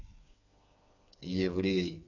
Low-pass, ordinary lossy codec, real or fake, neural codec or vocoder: 7.2 kHz; none; fake; codec, 16 kHz, 4 kbps, FreqCodec, smaller model